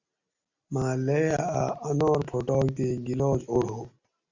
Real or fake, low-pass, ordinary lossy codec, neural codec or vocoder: real; 7.2 kHz; Opus, 64 kbps; none